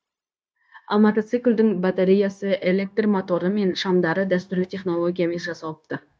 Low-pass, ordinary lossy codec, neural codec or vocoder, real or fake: none; none; codec, 16 kHz, 0.9 kbps, LongCat-Audio-Codec; fake